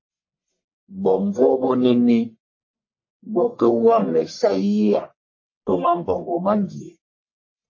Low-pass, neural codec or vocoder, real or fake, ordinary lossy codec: 7.2 kHz; codec, 44.1 kHz, 1.7 kbps, Pupu-Codec; fake; MP3, 32 kbps